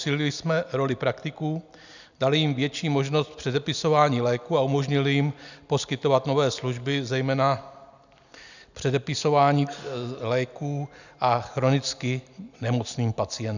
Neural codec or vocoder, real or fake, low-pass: none; real; 7.2 kHz